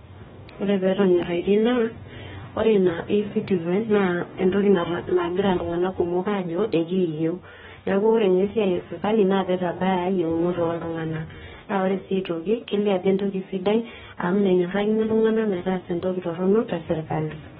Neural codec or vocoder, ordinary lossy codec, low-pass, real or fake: codec, 44.1 kHz, 2.6 kbps, DAC; AAC, 16 kbps; 19.8 kHz; fake